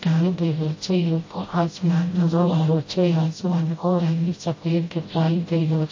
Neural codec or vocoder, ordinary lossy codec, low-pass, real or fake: codec, 16 kHz, 0.5 kbps, FreqCodec, smaller model; MP3, 32 kbps; 7.2 kHz; fake